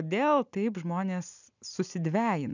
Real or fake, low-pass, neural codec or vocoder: real; 7.2 kHz; none